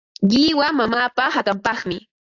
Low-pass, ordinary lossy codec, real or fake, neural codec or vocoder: 7.2 kHz; AAC, 48 kbps; fake; vocoder, 44.1 kHz, 128 mel bands every 512 samples, BigVGAN v2